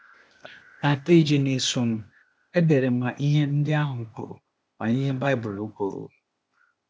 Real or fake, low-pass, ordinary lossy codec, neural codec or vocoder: fake; none; none; codec, 16 kHz, 0.8 kbps, ZipCodec